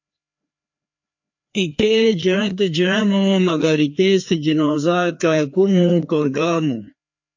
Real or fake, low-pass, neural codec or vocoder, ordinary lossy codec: fake; 7.2 kHz; codec, 16 kHz, 2 kbps, FreqCodec, larger model; MP3, 48 kbps